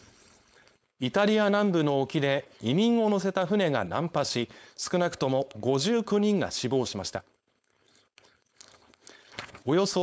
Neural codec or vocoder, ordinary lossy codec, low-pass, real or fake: codec, 16 kHz, 4.8 kbps, FACodec; none; none; fake